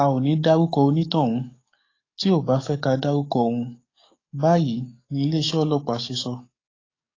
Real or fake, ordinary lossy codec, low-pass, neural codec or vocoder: fake; AAC, 32 kbps; 7.2 kHz; codec, 44.1 kHz, 7.8 kbps, DAC